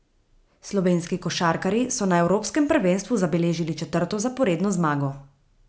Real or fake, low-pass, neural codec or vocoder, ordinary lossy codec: real; none; none; none